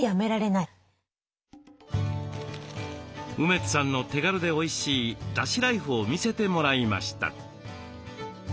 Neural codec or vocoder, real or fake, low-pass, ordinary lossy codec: none; real; none; none